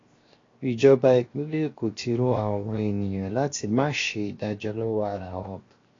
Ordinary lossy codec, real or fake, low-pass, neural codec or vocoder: AAC, 32 kbps; fake; 7.2 kHz; codec, 16 kHz, 0.7 kbps, FocalCodec